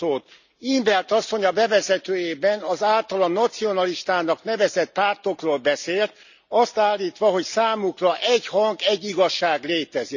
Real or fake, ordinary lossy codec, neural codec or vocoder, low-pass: real; none; none; 7.2 kHz